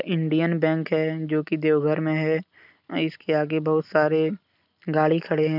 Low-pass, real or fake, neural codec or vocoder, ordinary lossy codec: 5.4 kHz; real; none; none